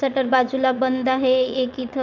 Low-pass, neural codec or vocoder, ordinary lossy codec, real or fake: 7.2 kHz; vocoder, 22.05 kHz, 80 mel bands, WaveNeXt; none; fake